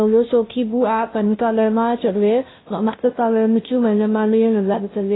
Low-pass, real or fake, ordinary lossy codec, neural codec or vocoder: 7.2 kHz; fake; AAC, 16 kbps; codec, 16 kHz, 0.5 kbps, FunCodec, trained on Chinese and English, 25 frames a second